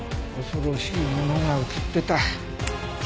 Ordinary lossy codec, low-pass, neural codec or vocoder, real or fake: none; none; none; real